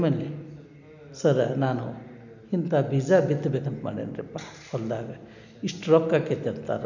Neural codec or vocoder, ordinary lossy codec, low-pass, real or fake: none; none; 7.2 kHz; real